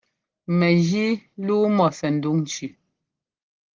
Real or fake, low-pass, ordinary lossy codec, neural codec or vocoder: real; 7.2 kHz; Opus, 16 kbps; none